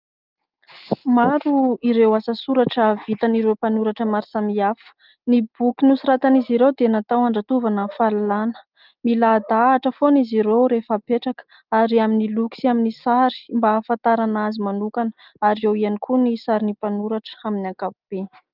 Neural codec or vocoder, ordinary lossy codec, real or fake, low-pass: none; Opus, 32 kbps; real; 5.4 kHz